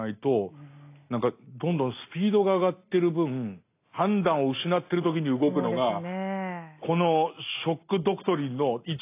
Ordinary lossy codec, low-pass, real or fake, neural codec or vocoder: AAC, 24 kbps; 3.6 kHz; real; none